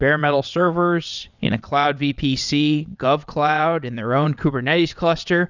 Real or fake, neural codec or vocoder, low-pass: fake; vocoder, 22.05 kHz, 80 mel bands, WaveNeXt; 7.2 kHz